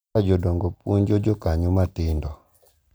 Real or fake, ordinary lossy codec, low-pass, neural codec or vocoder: real; none; none; none